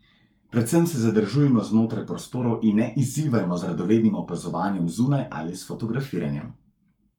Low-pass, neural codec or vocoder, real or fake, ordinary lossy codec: 19.8 kHz; codec, 44.1 kHz, 7.8 kbps, Pupu-Codec; fake; none